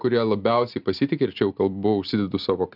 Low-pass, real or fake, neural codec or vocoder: 5.4 kHz; real; none